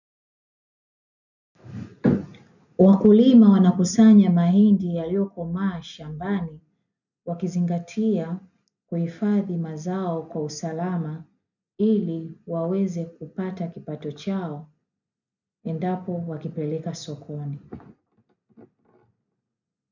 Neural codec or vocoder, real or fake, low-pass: none; real; 7.2 kHz